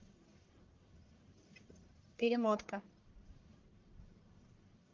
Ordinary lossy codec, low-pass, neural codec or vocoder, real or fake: Opus, 24 kbps; 7.2 kHz; codec, 44.1 kHz, 1.7 kbps, Pupu-Codec; fake